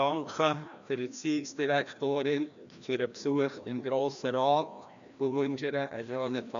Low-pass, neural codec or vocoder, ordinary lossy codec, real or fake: 7.2 kHz; codec, 16 kHz, 1 kbps, FreqCodec, larger model; AAC, 96 kbps; fake